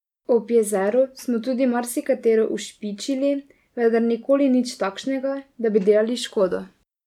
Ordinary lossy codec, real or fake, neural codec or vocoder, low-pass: none; real; none; 19.8 kHz